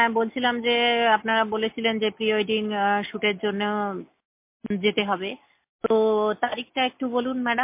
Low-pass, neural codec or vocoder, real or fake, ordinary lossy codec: 3.6 kHz; none; real; MP3, 24 kbps